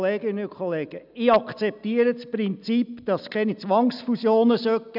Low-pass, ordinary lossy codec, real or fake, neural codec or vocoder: 5.4 kHz; none; real; none